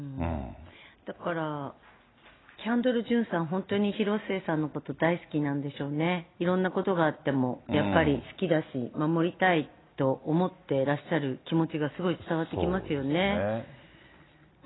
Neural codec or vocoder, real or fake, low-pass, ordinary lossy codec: none; real; 7.2 kHz; AAC, 16 kbps